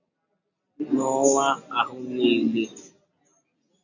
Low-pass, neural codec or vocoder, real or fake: 7.2 kHz; none; real